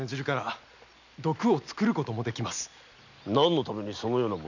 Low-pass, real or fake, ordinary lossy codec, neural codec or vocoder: 7.2 kHz; real; none; none